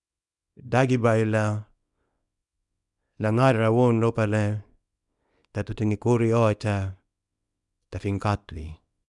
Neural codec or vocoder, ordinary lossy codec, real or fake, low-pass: codec, 24 kHz, 0.9 kbps, WavTokenizer, small release; none; fake; 10.8 kHz